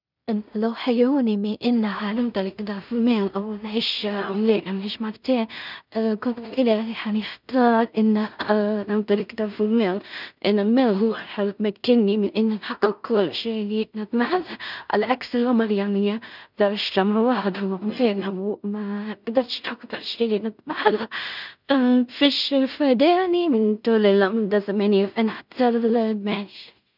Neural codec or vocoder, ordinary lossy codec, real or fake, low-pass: codec, 16 kHz in and 24 kHz out, 0.4 kbps, LongCat-Audio-Codec, two codebook decoder; none; fake; 5.4 kHz